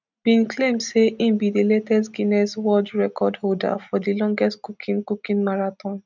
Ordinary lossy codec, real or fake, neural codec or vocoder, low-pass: none; real; none; 7.2 kHz